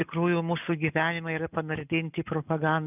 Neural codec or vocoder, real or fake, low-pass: none; real; 3.6 kHz